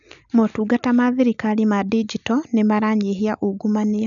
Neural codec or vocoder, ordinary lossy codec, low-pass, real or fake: none; none; 7.2 kHz; real